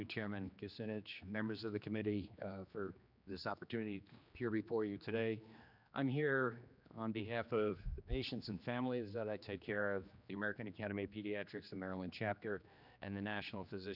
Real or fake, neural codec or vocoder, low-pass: fake; codec, 16 kHz, 2 kbps, X-Codec, HuBERT features, trained on general audio; 5.4 kHz